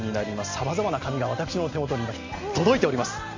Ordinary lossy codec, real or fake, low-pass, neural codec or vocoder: MP3, 48 kbps; real; 7.2 kHz; none